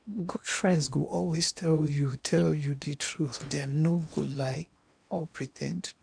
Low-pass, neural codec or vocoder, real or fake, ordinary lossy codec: 9.9 kHz; codec, 16 kHz in and 24 kHz out, 0.8 kbps, FocalCodec, streaming, 65536 codes; fake; none